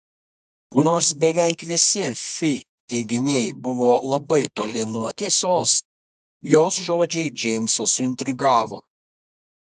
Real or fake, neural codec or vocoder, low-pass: fake; codec, 24 kHz, 0.9 kbps, WavTokenizer, medium music audio release; 10.8 kHz